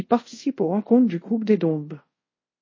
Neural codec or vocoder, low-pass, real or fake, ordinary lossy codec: codec, 24 kHz, 0.5 kbps, DualCodec; 7.2 kHz; fake; MP3, 32 kbps